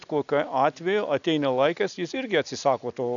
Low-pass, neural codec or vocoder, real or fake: 7.2 kHz; none; real